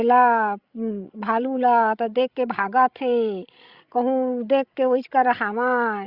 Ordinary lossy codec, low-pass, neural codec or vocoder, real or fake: Opus, 64 kbps; 5.4 kHz; codec, 16 kHz, 16 kbps, FreqCodec, larger model; fake